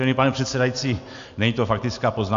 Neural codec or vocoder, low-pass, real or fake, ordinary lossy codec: none; 7.2 kHz; real; AAC, 64 kbps